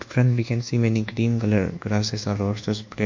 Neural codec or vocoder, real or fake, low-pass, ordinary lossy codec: codec, 24 kHz, 1.2 kbps, DualCodec; fake; 7.2 kHz; MP3, 64 kbps